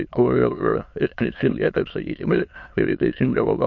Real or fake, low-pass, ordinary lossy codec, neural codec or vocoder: fake; 7.2 kHz; MP3, 48 kbps; autoencoder, 22.05 kHz, a latent of 192 numbers a frame, VITS, trained on many speakers